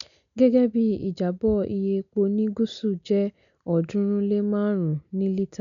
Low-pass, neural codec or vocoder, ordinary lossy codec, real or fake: 7.2 kHz; none; none; real